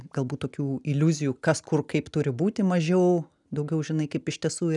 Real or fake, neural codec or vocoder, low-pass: real; none; 10.8 kHz